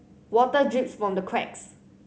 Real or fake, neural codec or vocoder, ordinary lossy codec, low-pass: real; none; none; none